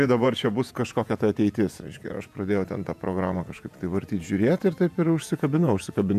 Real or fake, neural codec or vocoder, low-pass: fake; vocoder, 48 kHz, 128 mel bands, Vocos; 14.4 kHz